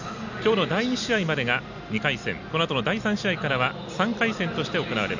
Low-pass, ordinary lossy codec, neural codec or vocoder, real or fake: 7.2 kHz; none; none; real